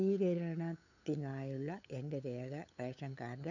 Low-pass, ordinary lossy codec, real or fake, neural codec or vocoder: 7.2 kHz; none; fake; codec, 16 kHz, 8 kbps, FunCodec, trained on LibriTTS, 25 frames a second